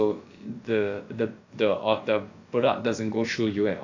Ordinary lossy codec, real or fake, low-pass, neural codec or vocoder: AAC, 48 kbps; fake; 7.2 kHz; codec, 16 kHz, about 1 kbps, DyCAST, with the encoder's durations